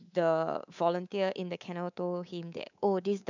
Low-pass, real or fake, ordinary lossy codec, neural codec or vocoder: 7.2 kHz; fake; none; codec, 24 kHz, 3.1 kbps, DualCodec